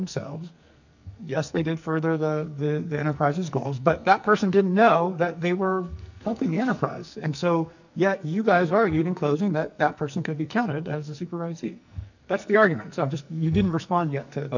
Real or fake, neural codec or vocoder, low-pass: fake; codec, 44.1 kHz, 2.6 kbps, SNAC; 7.2 kHz